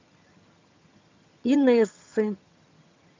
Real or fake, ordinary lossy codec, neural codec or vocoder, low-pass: fake; MP3, 64 kbps; vocoder, 22.05 kHz, 80 mel bands, HiFi-GAN; 7.2 kHz